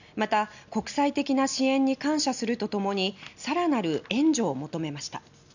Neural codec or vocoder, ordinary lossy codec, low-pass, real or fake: none; none; 7.2 kHz; real